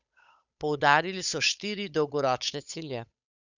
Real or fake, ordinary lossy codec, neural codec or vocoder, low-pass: fake; none; codec, 16 kHz, 8 kbps, FunCodec, trained on Chinese and English, 25 frames a second; 7.2 kHz